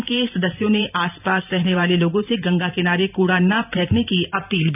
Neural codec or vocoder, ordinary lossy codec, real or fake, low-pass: vocoder, 44.1 kHz, 128 mel bands every 512 samples, BigVGAN v2; none; fake; 3.6 kHz